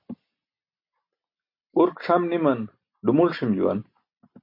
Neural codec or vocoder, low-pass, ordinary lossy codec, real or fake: none; 5.4 kHz; MP3, 32 kbps; real